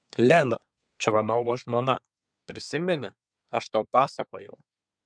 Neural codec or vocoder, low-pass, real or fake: codec, 24 kHz, 1 kbps, SNAC; 9.9 kHz; fake